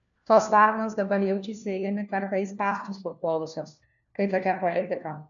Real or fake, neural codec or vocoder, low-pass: fake; codec, 16 kHz, 1 kbps, FunCodec, trained on LibriTTS, 50 frames a second; 7.2 kHz